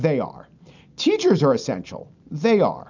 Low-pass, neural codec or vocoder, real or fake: 7.2 kHz; none; real